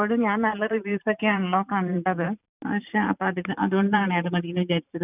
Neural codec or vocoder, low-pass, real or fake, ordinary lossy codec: vocoder, 44.1 kHz, 128 mel bands every 512 samples, BigVGAN v2; 3.6 kHz; fake; none